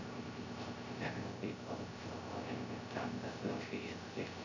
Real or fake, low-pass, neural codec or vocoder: fake; 7.2 kHz; codec, 16 kHz, 0.3 kbps, FocalCodec